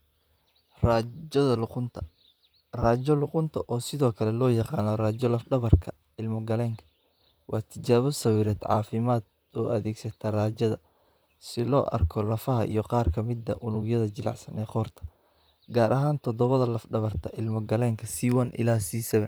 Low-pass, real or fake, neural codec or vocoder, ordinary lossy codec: none; fake; vocoder, 44.1 kHz, 128 mel bands, Pupu-Vocoder; none